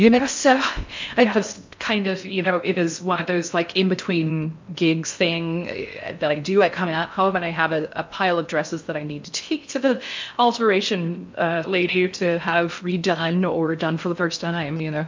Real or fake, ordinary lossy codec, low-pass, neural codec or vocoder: fake; MP3, 64 kbps; 7.2 kHz; codec, 16 kHz in and 24 kHz out, 0.6 kbps, FocalCodec, streaming, 4096 codes